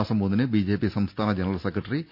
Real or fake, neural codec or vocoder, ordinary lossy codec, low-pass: real; none; none; 5.4 kHz